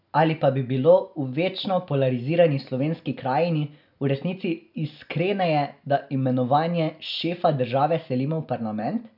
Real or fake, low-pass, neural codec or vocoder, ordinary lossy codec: real; 5.4 kHz; none; none